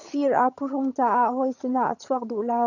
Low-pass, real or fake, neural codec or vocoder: 7.2 kHz; fake; vocoder, 22.05 kHz, 80 mel bands, HiFi-GAN